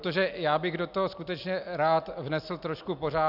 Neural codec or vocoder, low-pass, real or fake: none; 5.4 kHz; real